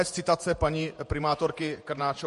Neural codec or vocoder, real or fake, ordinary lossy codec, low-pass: vocoder, 48 kHz, 128 mel bands, Vocos; fake; MP3, 48 kbps; 14.4 kHz